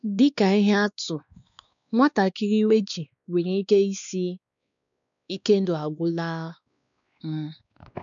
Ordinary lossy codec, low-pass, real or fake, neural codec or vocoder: none; 7.2 kHz; fake; codec, 16 kHz, 2 kbps, X-Codec, WavLM features, trained on Multilingual LibriSpeech